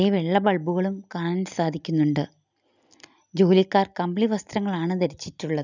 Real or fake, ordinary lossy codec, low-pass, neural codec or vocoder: real; none; 7.2 kHz; none